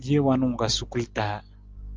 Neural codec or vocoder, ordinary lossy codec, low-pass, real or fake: none; Opus, 32 kbps; 7.2 kHz; real